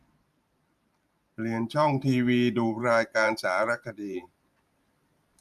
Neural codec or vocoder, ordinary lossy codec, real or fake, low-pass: vocoder, 44.1 kHz, 128 mel bands every 512 samples, BigVGAN v2; none; fake; 14.4 kHz